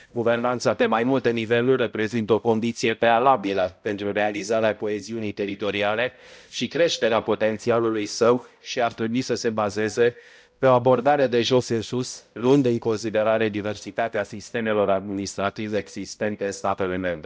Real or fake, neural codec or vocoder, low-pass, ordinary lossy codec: fake; codec, 16 kHz, 0.5 kbps, X-Codec, HuBERT features, trained on balanced general audio; none; none